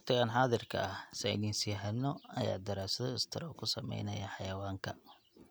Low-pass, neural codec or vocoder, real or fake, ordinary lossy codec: none; none; real; none